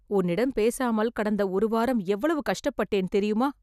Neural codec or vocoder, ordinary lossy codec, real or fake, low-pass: none; none; real; 14.4 kHz